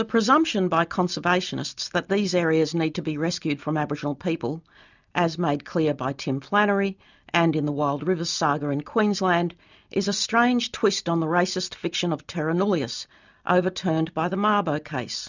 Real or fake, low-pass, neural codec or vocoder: real; 7.2 kHz; none